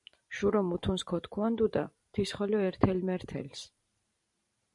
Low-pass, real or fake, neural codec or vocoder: 10.8 kHz; fake; vocoder, 44.1 kHz, 128 mel bands every 512 samples, BigVGAN v2